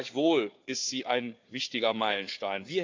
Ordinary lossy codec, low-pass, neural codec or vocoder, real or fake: AAC, 48 kbps; 7.2 kHz; codec, 16 kHz, 4 kbps, FunCodec, trained on Chinese and English, 50 frames a second; fake